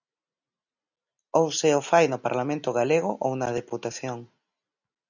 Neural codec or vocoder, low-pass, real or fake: none; 7.2 kHz; real